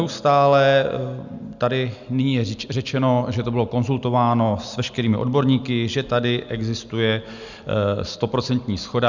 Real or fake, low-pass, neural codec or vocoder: real; 7.2 kHz; none